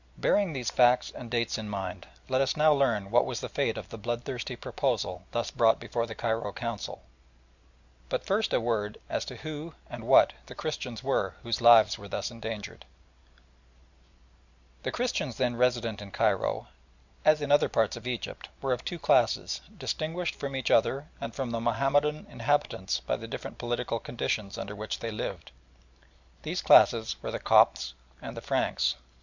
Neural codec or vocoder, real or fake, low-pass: none; real; 7.2 kHz